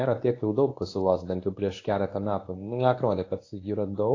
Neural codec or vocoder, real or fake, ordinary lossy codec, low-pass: codec, 24 kHz, 0.9 kbps, WavTokenizer, medium speech release version 1; fake; AAC, 32 kbps; 7.2 kHz